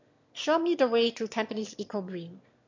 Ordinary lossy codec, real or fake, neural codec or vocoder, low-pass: MP3, 48 kbps; fake; autoencoder, 22.05 kHz, a latent of 192 numbers a frame, VITS, trained on one speaker; 7.2 kHz